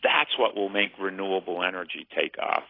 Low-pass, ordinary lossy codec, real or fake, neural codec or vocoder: 5.4 kHz; AAC, 24 kbps; real; none